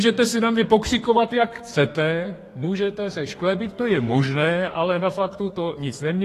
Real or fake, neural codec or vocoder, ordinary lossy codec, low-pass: fake; codec, 32 kHz, 1.9 kbps, SNAC; AAC, 48 kbps; 14.4 kHz